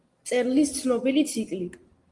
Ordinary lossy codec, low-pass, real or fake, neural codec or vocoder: Opus, 24 kbps; 10.8 kHz; real; none